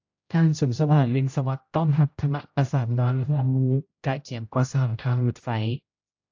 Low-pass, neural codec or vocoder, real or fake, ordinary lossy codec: 7.2 kHz; codec, 16 kHz, 0.5 kbps, X-Codec, HuBERT features, trained on general audio; fake; AAC, 48 kbps